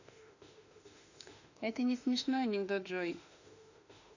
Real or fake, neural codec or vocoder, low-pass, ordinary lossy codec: fake; autoencoder, 48 kHz, 32 numbers a frame, DAC-VAE, trained on Japanese speech; 7.2 kHz; none